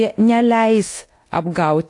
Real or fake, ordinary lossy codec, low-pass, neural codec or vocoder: fake; AAC, 48 kbps; 10.8 kHz; codec, 24 kHz, 0.9 kbps, DualCodec